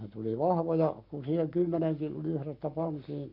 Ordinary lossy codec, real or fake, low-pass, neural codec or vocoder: none; fake; 5.4 kHz; codec, 44.1 kHz, 7.8 kbps, Pupu-Codec